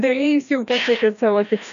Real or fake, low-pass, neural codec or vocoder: fake; 7.2 kHz; codec, 16 kHz, 1 kbps, FreqCodec, larger model